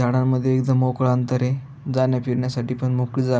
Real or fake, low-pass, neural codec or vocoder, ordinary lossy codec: real; none; none; none